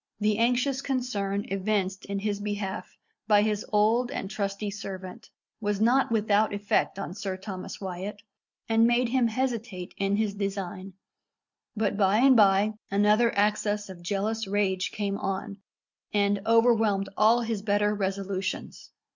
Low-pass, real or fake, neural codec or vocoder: 7.2 kHz; real; none